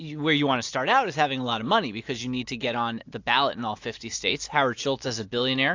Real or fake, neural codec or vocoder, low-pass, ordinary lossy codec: real; none; 7.2 kHz; AAC, 48 kbps